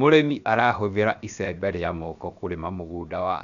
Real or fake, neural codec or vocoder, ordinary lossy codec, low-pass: fake; codec, 16 kHz, 0.7 kbps, FocalCodec; none; 7.2 kHz